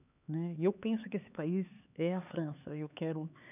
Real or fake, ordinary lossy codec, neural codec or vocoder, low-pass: fake; none; codec, 16 kHz, 4 kbps, X-Codec, HuBERT features, trained on LibriSpeech; 3.6 kHz